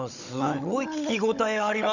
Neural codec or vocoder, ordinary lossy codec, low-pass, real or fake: codec, 16 kHz, 16 kbps, FunCodec, trained on Chinese and English, 50 frames a second; none; 7.2 kHz; fake